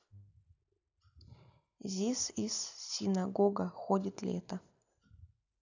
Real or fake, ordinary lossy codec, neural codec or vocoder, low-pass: real; none; none; 7.2 kHz